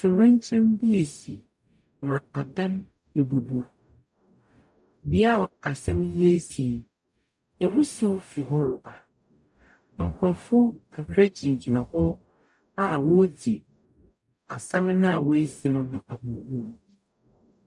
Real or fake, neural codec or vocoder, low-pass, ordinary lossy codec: fake; codec, 44.1 kHz, 0.9 kbps, DAC; 10.8 kHz; MP3, 96 kbps